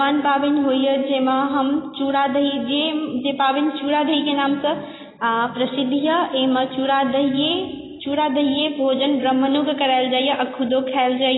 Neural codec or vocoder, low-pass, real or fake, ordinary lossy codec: none; 7.2 kHz; real; AAC, 16 kbps